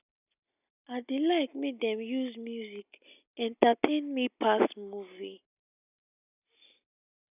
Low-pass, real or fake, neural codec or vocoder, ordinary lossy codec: 3.6 kHz; real; none; none